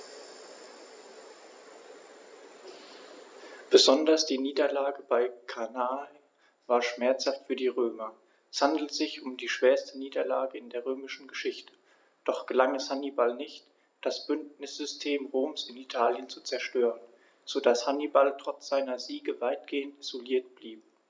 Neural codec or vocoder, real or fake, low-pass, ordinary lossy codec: none; real; none; none